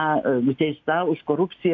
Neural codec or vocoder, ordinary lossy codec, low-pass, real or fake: none; AAC, 48 kbps; 7.2 kHz; real